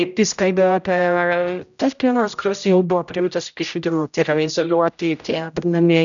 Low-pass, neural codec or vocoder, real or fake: 7.2 kHz; codec, 16 kHz, 0.5 kbps, X-Codec, HuBERT features, trained on general audio; fake